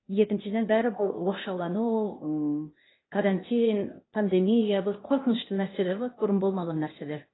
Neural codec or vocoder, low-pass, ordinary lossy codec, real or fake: codec, 16 kHz, 0.8 kbps, ZipCodec; 7.2 kHz; AAC, 16 kbps; fake